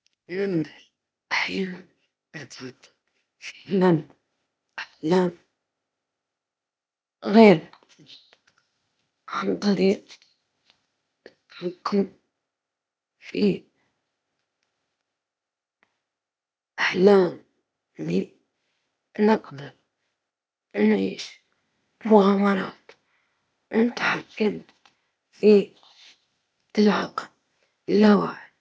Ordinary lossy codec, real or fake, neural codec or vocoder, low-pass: none; fake; codec, 16 kHz, 0.8 kbps, ZipCodec; none